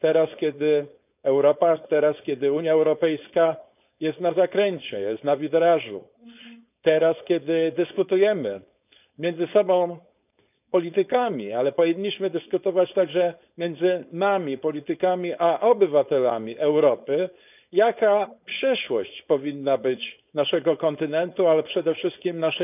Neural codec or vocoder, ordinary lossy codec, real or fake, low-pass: codec, 16 kHz, 4.8 kbps, FACodec; none; fake; 3.6 kHz